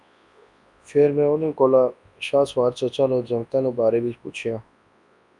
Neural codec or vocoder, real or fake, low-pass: codec, 24 kHz, 0.9 kbps, WavTokenizer, large speech release; fake; 10.8 kHz